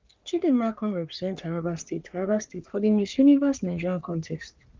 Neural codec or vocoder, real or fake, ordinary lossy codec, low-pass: codec, 44.1 kHz, 3.4 kbps, Pupu-Codec; fake; Opus, 32 kbps; 7.2 kHz